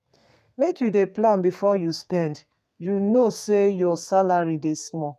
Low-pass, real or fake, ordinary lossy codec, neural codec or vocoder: 14.4 kHz; fake; none; codec, 32 kHz, 1.9 kbps, SNAC